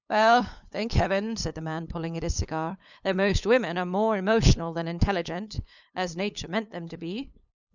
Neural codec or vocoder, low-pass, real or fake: codec, 16 kHz, 8 kbps, FunCodec, trained on LibriTTS, 25 frames a second; 7.2 kHz; fake